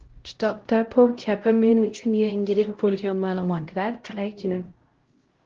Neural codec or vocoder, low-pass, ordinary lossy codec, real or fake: codec, 16 kHz, 0.5 kbps, X-Codec, HuBERT features, trained on LibriSpeech; 7.2 kHz; Opus, 16 kbps; fake